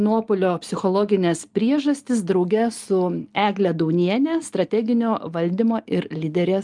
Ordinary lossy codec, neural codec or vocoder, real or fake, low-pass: Opus, 32 kbps; none; real; 10.8 kHz